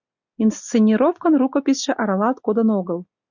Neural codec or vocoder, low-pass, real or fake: none; 7.2 kHz; real